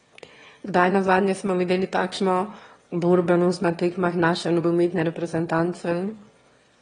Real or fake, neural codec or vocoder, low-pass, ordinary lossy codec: fake; autoencoder, 22.05 kHz, a latent of 192 numbers a frame, VITS, trained on one speaker; 9.9 kHz; AAC, 32 kbps